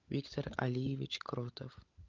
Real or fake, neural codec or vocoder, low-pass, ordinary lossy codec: fake; codec, 16 kHz, 16 kbps, FunCodec, trained on LibriTTS, 50 frames a second; 7.2 kHz; Opus, 24 kbps